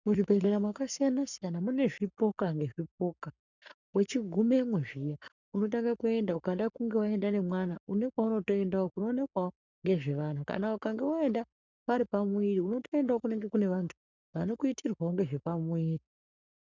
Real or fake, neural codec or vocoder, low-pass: fake; codec, 44.1 kHz, 7.8 kbps, Pupu-Codec; 7.2 kHz